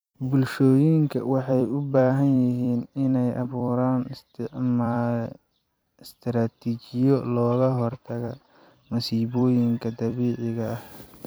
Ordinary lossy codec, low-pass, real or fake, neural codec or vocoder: none; none; fake; vocoder, 44.1 kHz, 128 mel bands every 256 samples, BigVGAN v2